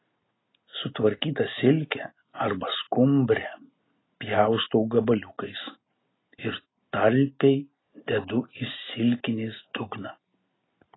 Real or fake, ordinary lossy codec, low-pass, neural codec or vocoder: real; AAC, 16 kbps; 7.2 kHz; none